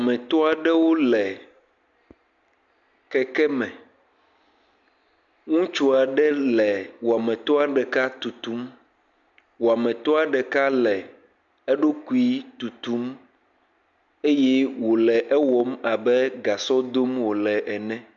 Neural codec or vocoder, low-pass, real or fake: none; 7.2 kHz; real